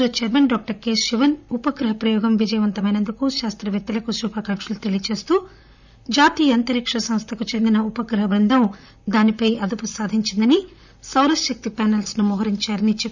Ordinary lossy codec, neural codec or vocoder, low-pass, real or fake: none; vocoder, 44.1 kHz, 128 mel bands, Pupu-Vocoder; 7.2 kHz; fake